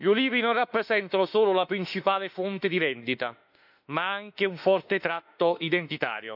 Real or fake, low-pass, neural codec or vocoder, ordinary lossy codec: fake; 5.4 kHz; autoencoder, 48 kHz, 32 numbers a frame, DAC-VAE, trained on Japanese speech; none